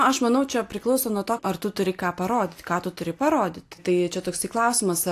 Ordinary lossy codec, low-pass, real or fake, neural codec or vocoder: AAC, 64 kbps; 14.4 kHz; real; none